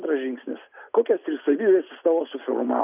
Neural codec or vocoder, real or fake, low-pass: none; real; 3.6 kHz